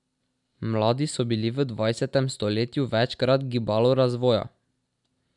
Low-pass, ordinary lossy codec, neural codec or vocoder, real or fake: 10.8 kHz; none; none; real